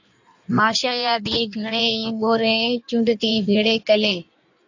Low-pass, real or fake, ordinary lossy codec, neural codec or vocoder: 7.2 kHz; fake; AAC, 48 kbps; codec, 16 kHz in and 24 kHz out, 1.1 kbps, FireRedTTS-2 codec